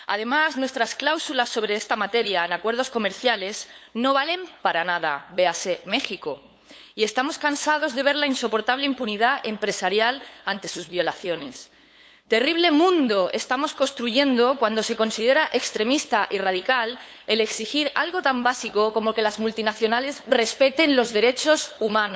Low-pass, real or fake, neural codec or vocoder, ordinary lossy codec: none; fake; codec, 16 kHz, 8 kbps, FunCodec, trained on LibriTTS, 25 frames a second; none